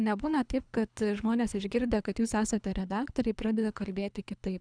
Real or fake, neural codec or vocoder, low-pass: fake; codec, 24 kHz, 3 kbps, HILCodec; 9.9 kHz